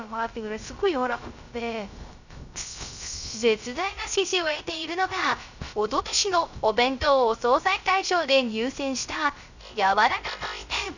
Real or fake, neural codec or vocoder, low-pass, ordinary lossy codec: fake; codec, 16 kHz, 0.3 kbps, FocalCodec; 7.2 kHz; none